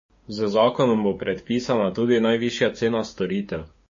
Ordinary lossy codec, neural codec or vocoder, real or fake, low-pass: MP3, 32 kbps; codec, 16 kHz, 6 kbps, DAC; fake; 7.2 kHz